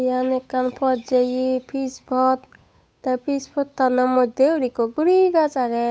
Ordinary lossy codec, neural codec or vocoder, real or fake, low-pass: none; codec, 16 kHz, 8 kbps, FunCodec, trained on Chinese and English, 25 frames a second; fake; none